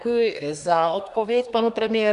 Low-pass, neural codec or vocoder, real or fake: 10.8 kHz; codec, 24 kHz, 1 kbps, SNAC; fake